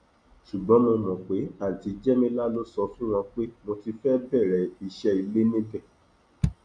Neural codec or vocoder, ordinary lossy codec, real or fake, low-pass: none; none; real; 9.9 kHz